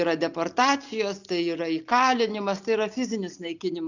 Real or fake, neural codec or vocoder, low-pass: real; none; 7.2 kHz